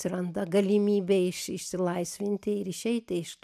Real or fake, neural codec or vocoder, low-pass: real; none; 14.4 kHz